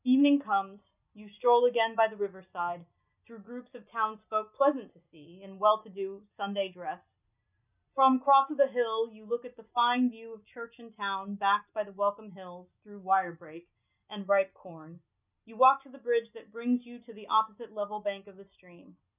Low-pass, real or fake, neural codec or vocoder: 3.6 kHz; real; none